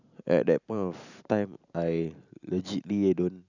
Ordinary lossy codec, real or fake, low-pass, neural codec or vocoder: none; real; 7.2 kHz; none